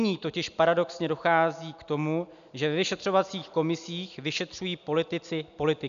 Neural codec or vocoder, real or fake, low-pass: none; real; 7.2 kHz